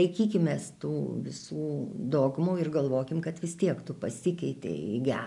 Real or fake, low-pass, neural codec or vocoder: real; 10.8 kHz; none